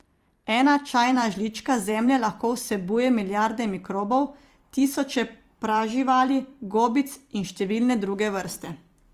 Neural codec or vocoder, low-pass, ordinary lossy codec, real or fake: vocoder, 44.1 kHz, 128 mel bands every 256 samples, BigVGAN v2; 14.4 kHz; Opus, 32 kbps; fake